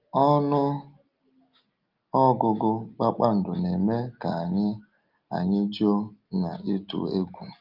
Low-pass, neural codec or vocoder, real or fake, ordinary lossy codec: 5.4 kHz; none; real; Opus, 32 kbps